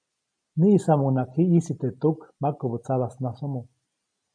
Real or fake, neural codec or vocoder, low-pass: real; none; 9.9 kHz